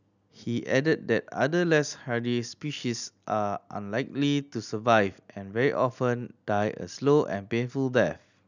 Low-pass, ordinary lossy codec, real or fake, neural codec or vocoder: 7.2 kHz; none; real; none